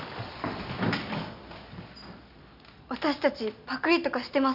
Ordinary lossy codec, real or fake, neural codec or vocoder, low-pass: none; real; none; 5.4 kHz